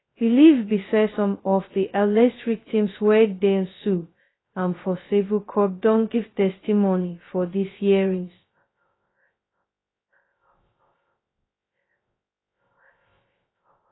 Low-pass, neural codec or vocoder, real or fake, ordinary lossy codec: 7.2 kHz; codec, 16 kHz, 0.2 kbps, FocalCodec; fake; AAC, 16 kbps